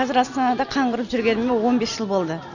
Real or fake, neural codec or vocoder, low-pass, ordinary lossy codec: real; none; 7.2 kHz; none